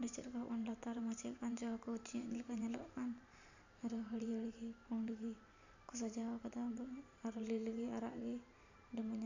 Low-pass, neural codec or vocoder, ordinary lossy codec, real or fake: 7.2 kHz; vocoder, 44.1 kHz, 128 mel bands every 512 samples, BigVGAN v2; none; fake